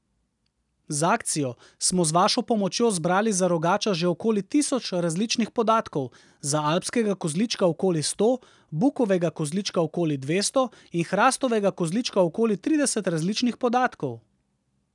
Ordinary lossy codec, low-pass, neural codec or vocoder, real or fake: none; 10.8 kHz; none; real